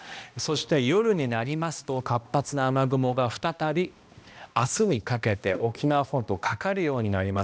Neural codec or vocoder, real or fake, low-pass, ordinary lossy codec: codec, 16 kHz, 1 kbps, X-Codec, HuBERT features, trained on balanced general audio; fake; none; none